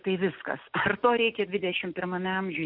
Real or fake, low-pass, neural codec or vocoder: real; 5.4 kHz; none